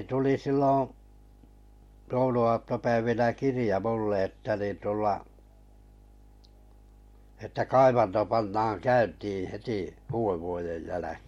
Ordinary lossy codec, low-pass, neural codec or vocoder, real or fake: MP3, 64 kbps; 19.8 kHz; none; real